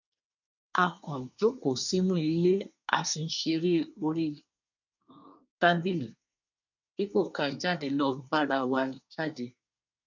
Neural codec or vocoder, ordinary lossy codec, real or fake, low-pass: codec, 24 kHz, 1 kbps, SNAC; none; fake; 7.2 kHz